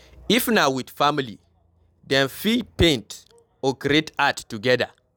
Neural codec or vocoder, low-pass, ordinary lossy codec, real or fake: none; none; none; real